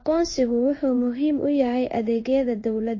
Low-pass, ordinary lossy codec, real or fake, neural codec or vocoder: 7.2 kHz; MP3, 32 kbps; fake; codec, 16 kHz in and 24 kHz out, 1 kbps, XY-Tokenizer